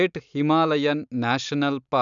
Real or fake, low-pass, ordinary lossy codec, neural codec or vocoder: real; 7.2 kHz; none; none